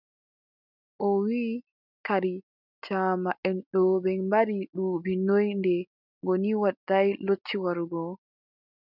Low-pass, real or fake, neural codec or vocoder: 5.4 kHz; real; none